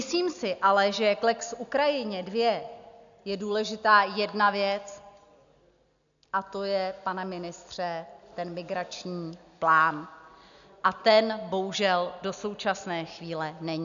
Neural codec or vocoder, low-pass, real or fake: none; 7.2 kHz; real